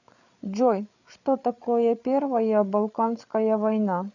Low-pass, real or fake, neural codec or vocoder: 7.2 kHz; fake; codec, 16 kHz, 8 kbps, FreqCodec, larger model